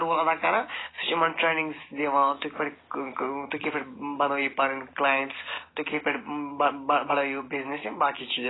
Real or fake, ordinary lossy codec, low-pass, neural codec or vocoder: real; AAC, 16 kbps; 7.2 kHz; none